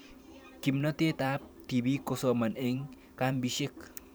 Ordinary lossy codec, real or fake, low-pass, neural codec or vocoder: none; fake; none; vocoder, 44.1 kHz, 128 mel bands every 512 samples, BigVGAN v2